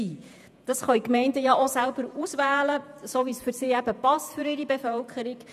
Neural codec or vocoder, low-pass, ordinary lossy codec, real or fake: vocoder, 48 kHz, 128 mel bands, Vocos; 14.4 kHz; none; fake